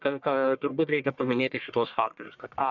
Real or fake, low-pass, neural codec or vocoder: fake; 7.2 kHz; codec, 44.1 kHz, 1.7 kbps, Pupu-Codec